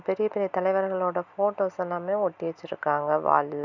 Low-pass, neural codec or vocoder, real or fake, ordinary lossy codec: 7.2 kHz; none; real; none